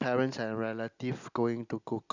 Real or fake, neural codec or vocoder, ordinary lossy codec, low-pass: real; none; none; 7.2 kHz